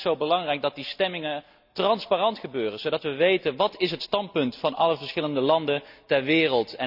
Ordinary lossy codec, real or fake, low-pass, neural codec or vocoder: none; real; 5.4 kHz; none